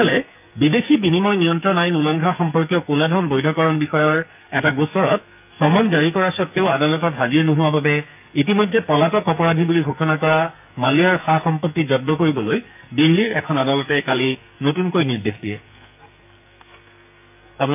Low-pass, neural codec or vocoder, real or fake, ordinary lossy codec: 3.6 kHz; codec, 44.1 kHz, 2.6 kbps, SNAC; fake; AAC, 32 kbps